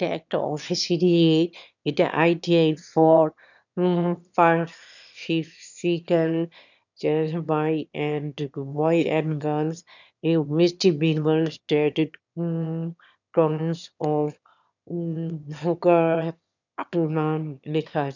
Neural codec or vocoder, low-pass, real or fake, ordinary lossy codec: autoencoder, 22.05 kHz, a latent of 192 numbers a frame, VITS, trained on one speaker; 7.2 kHz; fake; none